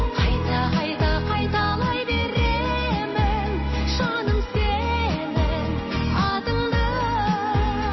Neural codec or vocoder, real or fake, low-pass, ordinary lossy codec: none; real; 7.2 kHz; MP3, 24 kbps